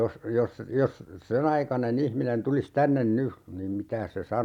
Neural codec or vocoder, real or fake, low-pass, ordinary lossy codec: none; real; 19.8 kHz; none